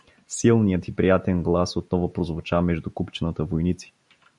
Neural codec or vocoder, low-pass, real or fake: none; 10.8 kHz; real